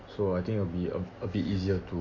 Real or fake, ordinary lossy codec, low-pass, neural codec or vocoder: real; Opus, 64 kbps; 7.2 kHz; none